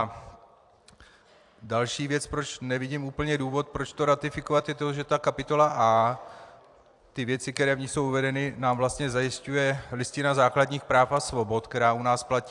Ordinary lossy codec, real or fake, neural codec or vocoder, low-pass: AAC, 64 kbps; fake; vocoder, 44.1 kHz, 128 mel bands every 256 samples, BigVGAN v2; 10.8 kHz